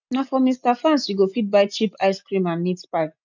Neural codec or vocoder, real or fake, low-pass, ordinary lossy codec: none; real; 7.2 kHz; none